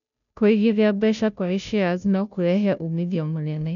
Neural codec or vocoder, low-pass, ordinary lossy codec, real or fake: codec, 16 kHz, 0.5 kbps, FunCodec, trained on Chinese and English, 25 frames a second; 7.2 kHz; none; fake